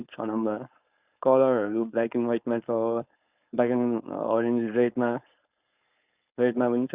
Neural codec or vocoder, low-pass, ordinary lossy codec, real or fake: codec, 16 kHz, 4.8 kbps, FACodec; 3.6 kHz; Opus, 64 kbps; fake